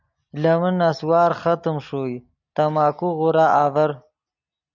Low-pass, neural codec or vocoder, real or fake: 7.2 kHz; none; real